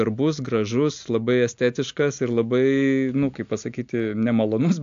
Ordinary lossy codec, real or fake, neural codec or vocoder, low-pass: AAC, 64 kbps; real; none; 7.2 kHz